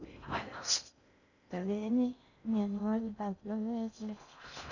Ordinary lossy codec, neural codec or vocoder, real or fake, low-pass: none; codec, 16 kHz in and 24 kHz out, 0.6 kbps, FocalCodec, streaming, 4096 codes; fake; 7.2 kHz